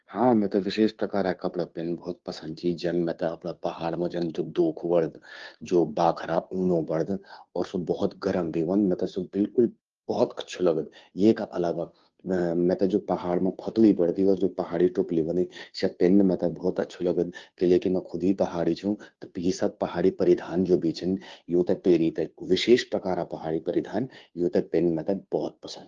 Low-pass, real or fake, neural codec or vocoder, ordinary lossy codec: 7.2 kHz; fake; codec, 16 kHz, 2 kbps, FunCodec, trained on Chinese and English, 25 frames a second; Opus, 32 kbps